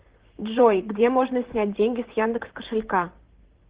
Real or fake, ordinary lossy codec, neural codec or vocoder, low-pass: fake; Opus, 16 kbps; vocoder, 44.1 kHz, 128 mel bands, Pupu-Vocoder; 3.6 kHz